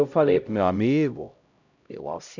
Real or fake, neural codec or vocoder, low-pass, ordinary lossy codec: fake; codec, 16 kHz, 0.5 kbps, X-Codec, HuBERT features, trained on LibriSpeech; 7.2 kHz; none